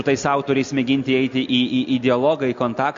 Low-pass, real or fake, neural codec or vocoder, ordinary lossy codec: 7.2 kHz; real; none; MP3, 48 kbps